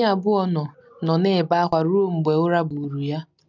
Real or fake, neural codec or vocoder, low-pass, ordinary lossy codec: real; none; 7.2 kHz; none